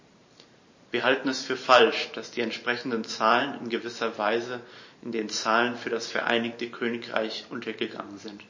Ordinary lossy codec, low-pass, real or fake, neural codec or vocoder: MP3, 32 kbps; 7.2 kHz; fake; vocoder, 44.1 kHz, 128 mel bands every 512 samples, BigVGAN v2